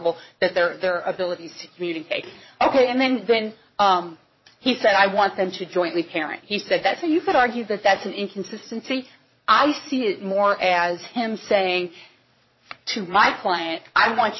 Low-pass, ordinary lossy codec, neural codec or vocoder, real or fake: 7.2 kHz; MP3, 24 kbps; vocoder, 22.05 kHz, 80 mel bands, Vocos; fake